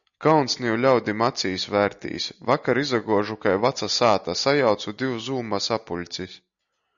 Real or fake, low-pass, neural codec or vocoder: real; 7.2 kHz; none